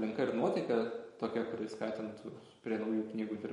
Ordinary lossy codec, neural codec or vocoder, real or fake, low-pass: MP3, 48 kbps; vocoder, 44.1 kHz, 128 mel bands every 512 samples, BigVGAN v2; fake; 19.8 kHz